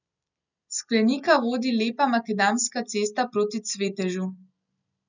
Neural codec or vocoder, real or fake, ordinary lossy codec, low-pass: none; real; none; 7.2 kHz